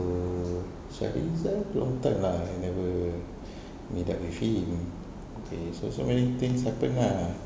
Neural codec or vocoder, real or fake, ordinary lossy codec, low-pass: none; real; none; none